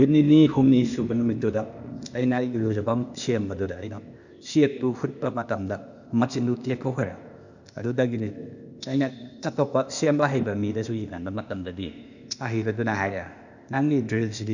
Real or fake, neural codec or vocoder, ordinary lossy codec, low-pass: fake; codec, 16 kHz, 0.8 kbps, ZipCodec; none; 7.2 kHz